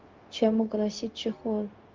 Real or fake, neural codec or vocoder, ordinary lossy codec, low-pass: fake; codec, 16 kHz, 0.4 kbps, LongCat-Audio-Codec; Opus, 24 kbps; 7.2 kHz